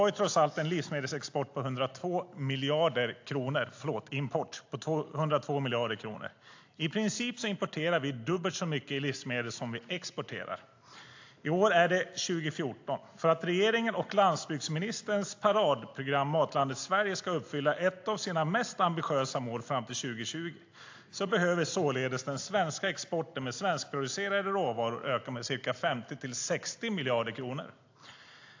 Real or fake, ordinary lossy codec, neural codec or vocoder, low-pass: real; AAC, 48 kbps; none; 7.2 kHz